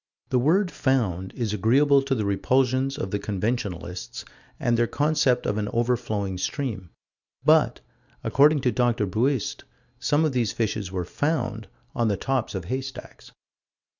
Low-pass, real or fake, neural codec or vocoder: 7.2 kHz; real; none